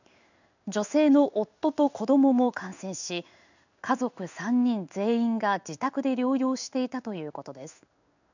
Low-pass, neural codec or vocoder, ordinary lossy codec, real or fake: 7.2 kHz; codec, 16 kHz in and 24 kHz out, 1 kbps, XY-Tokenizer; none; fake